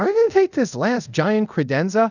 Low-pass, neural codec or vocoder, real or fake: 7.2 kHz; codec, 24 kHz, 0.5 kbps, DualCodec; fake